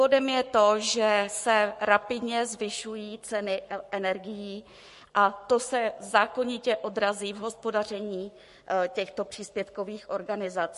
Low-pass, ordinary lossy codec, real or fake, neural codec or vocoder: 14.4 kHz; MP3, 48 kbps; fake; codec, 44.1 kHz, 7.8 kbps, Pupu-Codec